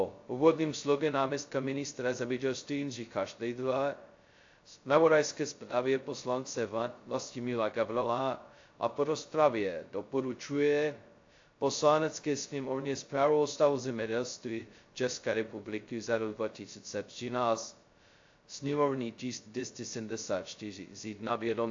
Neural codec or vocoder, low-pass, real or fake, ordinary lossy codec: codec, 16 kHz, 0.2 kbps, FocalCodec; 7.2 kHz; fake; AAC, 48 kbps